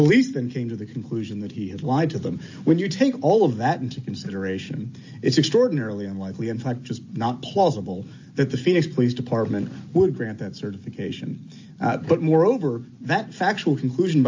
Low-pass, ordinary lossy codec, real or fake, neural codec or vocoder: 7.2 kHz; AAC, 48 kbps; real; none